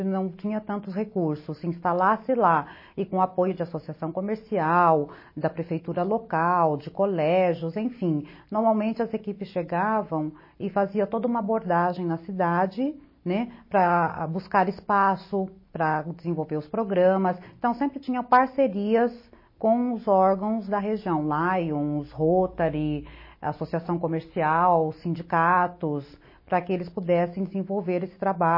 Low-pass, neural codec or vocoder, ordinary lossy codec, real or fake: 5.4 kHz; none; MP3, 24 kbps; real